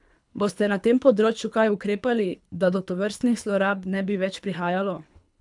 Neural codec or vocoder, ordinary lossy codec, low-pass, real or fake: codec, 24 kHz, 3 kbps, HILCodec; none; 10.8 kHz; fake